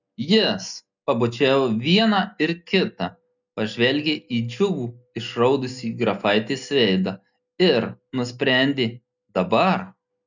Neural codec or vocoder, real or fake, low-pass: none; real; 7.2 kHz